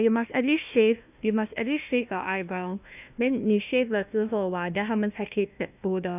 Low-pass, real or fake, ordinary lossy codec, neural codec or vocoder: 3.6 kHz; fake; none; codec, 16 kHz, 1 kbps, FunCodec, trained on Chinese and English, 50 frames a second